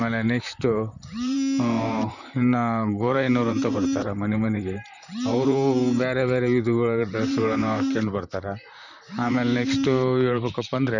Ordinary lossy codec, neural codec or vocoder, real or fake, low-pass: none; vocoder, 44.1 kHz, 128 mel bands, Pupu-Vocoder; fake; 7.2 kHz